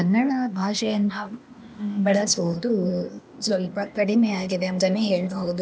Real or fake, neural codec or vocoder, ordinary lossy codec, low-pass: fake; codec, 16 kHz, 0.8 kbps, ZipCodec; none; none